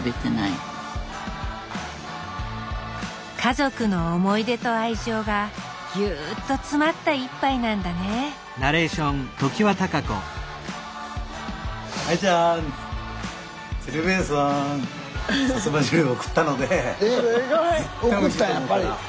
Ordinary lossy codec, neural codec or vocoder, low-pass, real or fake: none; none; none; real